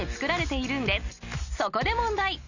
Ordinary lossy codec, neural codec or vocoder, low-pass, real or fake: none; none; 7.2 kHz; real